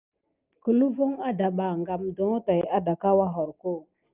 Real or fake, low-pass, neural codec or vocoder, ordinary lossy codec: real; 3.6 kHz; none; Opus, 24 kbps